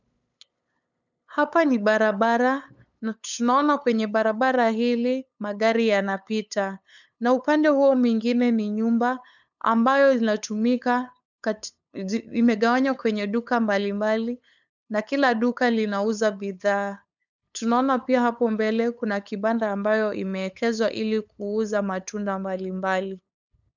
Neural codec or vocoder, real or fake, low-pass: codec, 16 kHz, 8 kbps, FunCodec, trained on LibriTTS, 25 frames a second; fake; 7.2 kHz